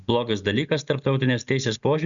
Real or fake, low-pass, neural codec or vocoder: real; 7.2 kHz; none